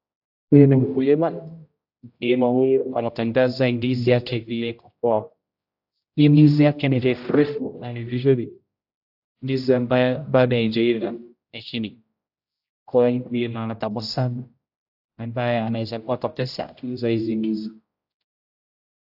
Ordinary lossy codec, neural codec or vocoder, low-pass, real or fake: AAC, 48 kbps; codec, 16 kHz, 0.5 kbps, X-Codec, HuBERT features, trained on general audio; 5.4 kHz; fake